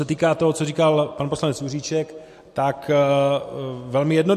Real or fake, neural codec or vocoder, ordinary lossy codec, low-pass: real; none; MP3, 64 kbps; 14.4 kHz